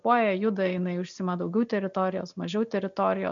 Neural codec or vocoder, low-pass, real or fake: none; 7.2 kHz; real